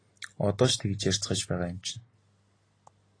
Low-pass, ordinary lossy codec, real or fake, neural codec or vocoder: 9.9 kHz; AAC, 32 kbps; real; none